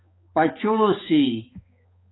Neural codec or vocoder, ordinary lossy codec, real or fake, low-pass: codec, 16 kHz, 4 kbps, X-Codec, HuBERT features, trained on balanced general audio; AAC, 16 kbps; fake; 7.2 kHz